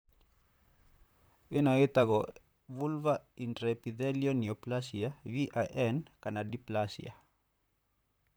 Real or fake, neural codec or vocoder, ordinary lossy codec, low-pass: fake; vocoder, 44.1 kHz, 128 mel bands, Pupu-Vocoder; none; none